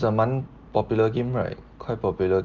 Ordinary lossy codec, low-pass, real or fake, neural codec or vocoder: Opus, 32 kbps; 7.2 kHz; real; none